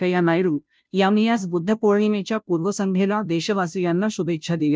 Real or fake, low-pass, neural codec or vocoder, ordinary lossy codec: fake; none; codec, 16 kHz, 0.5 kbps, FunCodec, trained on Chinese and English, 25 frames a second; none